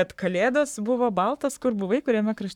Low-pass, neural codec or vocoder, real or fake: 19.8 kHz; codec, 44.1 kHz, 7.8 kbps, Pupu-Codec; fake